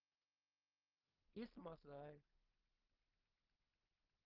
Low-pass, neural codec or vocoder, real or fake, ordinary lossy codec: 5.4 kHz; codec, 16 kHz in and 24 kHz out, 0.4 kbps, LongCat-Audio-Codec, two codebook decoder; fake; Opus, 24 kbps